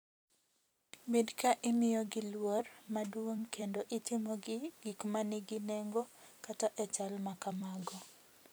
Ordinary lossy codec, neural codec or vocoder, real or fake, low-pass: none; vocoder, 44.1 kHz, 128 mel bands every 256 samples, BigVGAN v2; fake; none